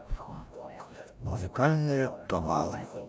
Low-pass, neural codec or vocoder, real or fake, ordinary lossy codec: none; codec, 16 kHz, 0.5 kbps, FreqCodec, larger model; fake; none